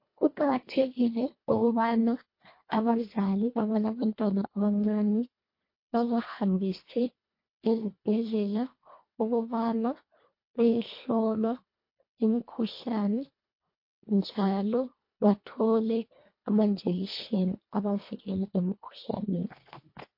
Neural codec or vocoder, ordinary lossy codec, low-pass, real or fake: codec, 24 kHz, 1.5 kbps, HILCodec; MP3, 32 kbps; 5.4 kHz; fake